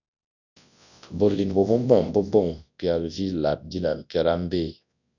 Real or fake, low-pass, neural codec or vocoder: fake; 7.2 kHz; codec, 24 kHz, 0.9 kbps, WavTokenizer, large speech release